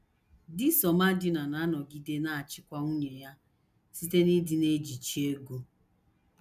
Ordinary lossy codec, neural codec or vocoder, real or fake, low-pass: none; none; real; 14.4 kHz